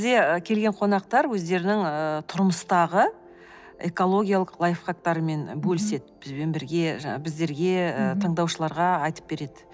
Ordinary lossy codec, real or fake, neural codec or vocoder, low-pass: none; real; none; none